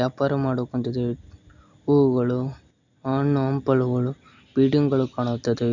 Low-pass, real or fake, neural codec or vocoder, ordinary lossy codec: 7.2 kHz; real; none; none